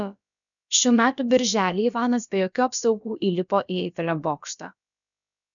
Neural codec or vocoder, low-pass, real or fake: codec, 16 kHz, about 1 kbps, DyCAST, with the encoder's durations; 7.2 kHz; fake